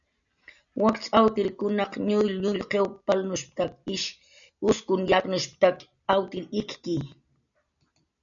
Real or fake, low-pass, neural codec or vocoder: real; 7.2 kHz; none